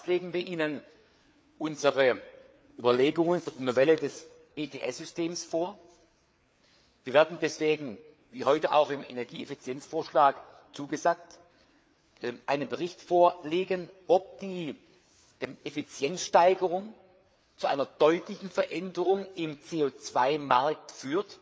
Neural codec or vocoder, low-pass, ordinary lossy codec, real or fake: codec, 16 kHz, 4 kbps, FreqCodec, larger model; none; none; fake